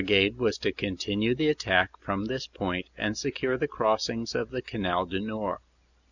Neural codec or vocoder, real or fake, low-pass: none; real; 7.2 kHz